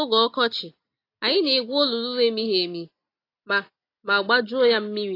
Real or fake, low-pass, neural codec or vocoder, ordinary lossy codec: real; 5.4 kHz; none; AAC, 32 kbps